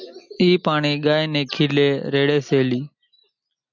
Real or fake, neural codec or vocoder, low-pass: real; none; 7.2 kHz